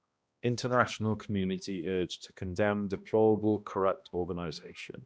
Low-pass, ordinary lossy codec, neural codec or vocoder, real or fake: none; none; codec, 16 kHz, 1 kbps, X-Codec, HuBERT features, trained on balanced general audio; fake